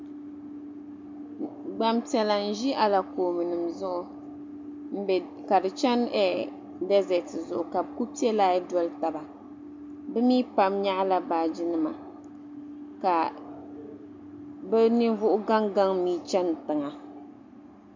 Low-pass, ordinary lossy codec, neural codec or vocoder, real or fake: 7.2 kHz; MP3, 64 kbps; none; real